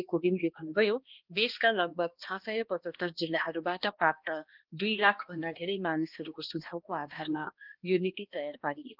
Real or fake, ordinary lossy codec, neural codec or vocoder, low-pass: fake; Opus, 32 kbps; codec, 16 kHz, 1 kbps, X-Codec, HuBERT features, trained on balanced general audio; 5.4 kHz